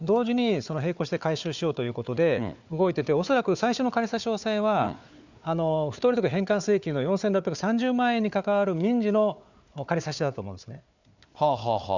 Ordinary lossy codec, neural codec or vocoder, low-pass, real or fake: none; codec, 16 kHz, 4 kbps, FunCodec, trained on Chinese and English, 50 frames a second; 7.2 kHz; fake